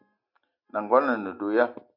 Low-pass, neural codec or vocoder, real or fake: 5.4 kHz; none; real